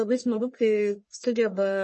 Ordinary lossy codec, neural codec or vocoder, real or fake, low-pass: MP3, 32 kbps; codec, 44.1 kHz, 1.7 kbps, Pupu-Codec; fake; 10.8 kHz